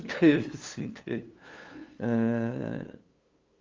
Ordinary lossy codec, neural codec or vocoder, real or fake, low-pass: Opus, 32 kbps; codec, 16 kHz, 2 kbps, FunCodec, trained on LibriTTS, 25 frames a second; fake; 7.2 kHz